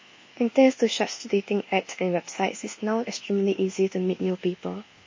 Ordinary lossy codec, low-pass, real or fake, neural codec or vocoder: MP3, 32 kbps; 7.2 kHz; fake; codec, 24 kHz, 1.2 kbps, DualCodec